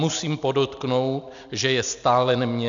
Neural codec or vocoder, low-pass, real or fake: none; 7.2 kHz; real